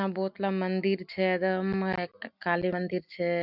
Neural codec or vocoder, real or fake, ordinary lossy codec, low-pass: none; real; none; 5.4 kHz